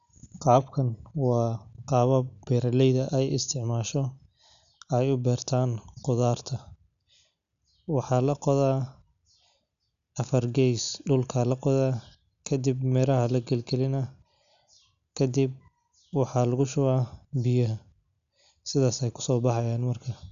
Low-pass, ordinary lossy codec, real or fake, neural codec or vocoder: 7.2 kHz; none; real; none